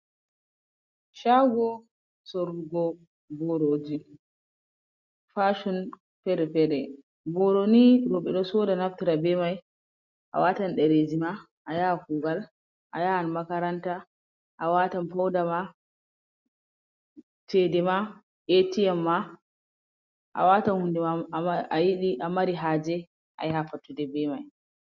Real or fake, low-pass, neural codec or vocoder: real; 7.2 kHz; none